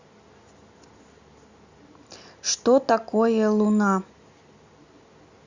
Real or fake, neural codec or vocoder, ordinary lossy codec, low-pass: real; none; Opus, 64 kbps; 7.2 kHz